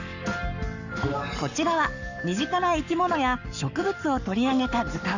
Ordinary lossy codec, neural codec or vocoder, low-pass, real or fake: none; codec, 44.1 kHz, 7.8 kbps, Pupu-Codec; 7.2 kHz; fake